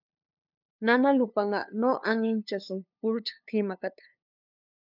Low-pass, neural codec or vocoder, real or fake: 5.4 kHz; codec, 16 kHz, 2 kbps, FunCodec, trained on LibriTTS, 25 frames a second; fake